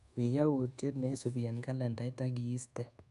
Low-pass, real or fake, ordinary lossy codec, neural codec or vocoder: 10.8 kHz; fake; none; codec, 24 kHz, 1.2 kbps, DualCodec